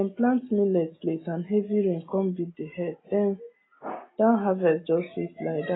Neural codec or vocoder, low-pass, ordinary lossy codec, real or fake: none; 7.2 kHz; AAC, 16 kbps; real